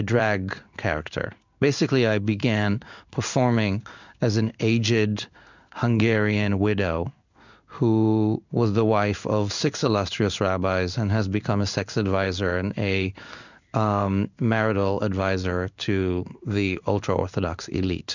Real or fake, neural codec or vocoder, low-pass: fake; codec, 16 kHz in and 24 kHz out, 1 kbps, XY-Tokenizer; 7.2 kHz